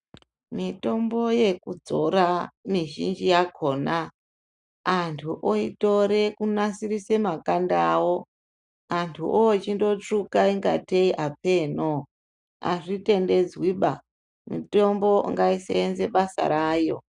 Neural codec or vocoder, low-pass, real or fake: none; 10.8 kHz; real